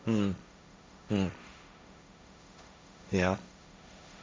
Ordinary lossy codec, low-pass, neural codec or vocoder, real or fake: none; none; codec, 16 kHz, 1.1 kbps, Voila-Tokenizer; fake